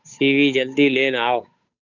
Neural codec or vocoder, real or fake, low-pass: codec, 16 kHz, 8 kbps, FunCodec, trained on Chinese and English, 25 frames a second; fake; 7.2 kHz